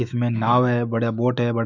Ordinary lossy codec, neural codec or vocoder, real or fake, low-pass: none; autoencoder, 48 kHz, 128 numbers a frame, DAC-VAE, trained on Japanese speech; fake; 7.2 kHz